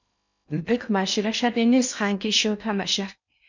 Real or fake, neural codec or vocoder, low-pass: fake; codec, 16 kHz in and 24 kHz out, 0.6 kbps, FocalCodec, streaming, 2048 codes; 7.2 kHz